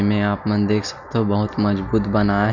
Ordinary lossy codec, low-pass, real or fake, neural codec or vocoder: none; 7.2 kHz; real; none